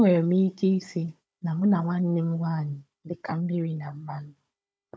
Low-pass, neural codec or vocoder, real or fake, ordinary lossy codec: none; codec, 16 kHz, 16 kbps, FunCodec, trained on Chinese and English, 50 frames a second; fake; none